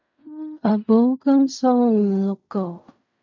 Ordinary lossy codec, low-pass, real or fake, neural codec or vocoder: MP3, 48 kbps; 7.2 kHz; fake; codec, 16 kHz in and 24 kHz out, 0.4 kbps, LongCat-Audio-Codec, fine tuned four codebook decoder